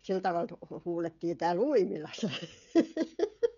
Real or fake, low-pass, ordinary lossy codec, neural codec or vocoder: fake; 7.2 kHz; none; codec, 16 kHz, 16 kbps, FreqCodec, smaller model